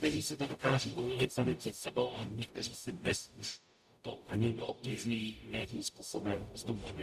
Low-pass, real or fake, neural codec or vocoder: 14.4 kHz; fake; codec, 44.1 kHz, 0.9 kbps, DAC